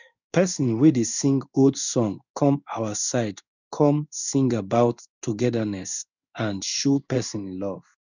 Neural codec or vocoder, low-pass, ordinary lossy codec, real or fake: codec, 16 kHz in and 24 kHz out, 1 kbps, XY-Tokenizer; 7.2 kHz; none; fake